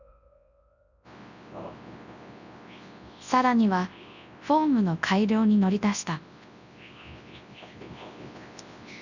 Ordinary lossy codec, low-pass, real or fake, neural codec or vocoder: none; 7.2 kHz; fake; codec, 24 kHz, 0.9 kbps, WavTokenizer, large speech release